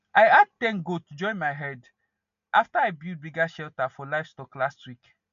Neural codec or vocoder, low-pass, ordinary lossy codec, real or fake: none; 7.2 kHz; none; real